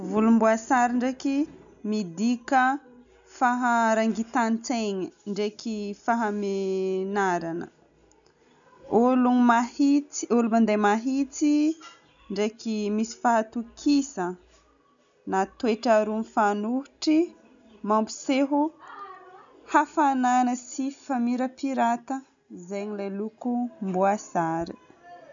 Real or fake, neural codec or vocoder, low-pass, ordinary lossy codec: real; none; 7.2 kHz; none